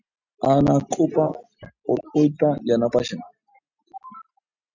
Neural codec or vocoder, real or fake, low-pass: none; real; 7.2 kHz